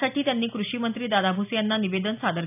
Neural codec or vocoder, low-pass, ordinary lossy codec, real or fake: none; 3.6 kHz; none; real